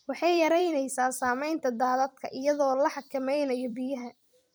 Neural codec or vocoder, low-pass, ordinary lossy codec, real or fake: vocoder, 44.1 kHz, 128 mel bands every 256 samples, BigVGAN v2; none; none; fake